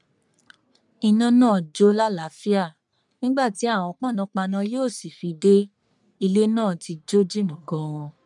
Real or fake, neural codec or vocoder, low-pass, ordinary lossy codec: fake; codec, 44.1 kHz, 3.4 kbps, Pupu-Codec; 10.8 kHz; none